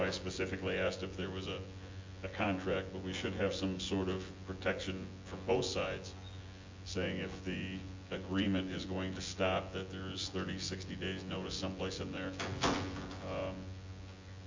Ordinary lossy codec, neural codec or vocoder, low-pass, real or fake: MP3, 48 kbps; vocoder, 24 kHz, 100 mel bands, Vocos; 7.2 kHz; fake